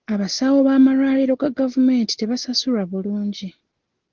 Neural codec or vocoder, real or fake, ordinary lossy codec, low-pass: none; real; Opus, 16 kbps; 7.2 kHz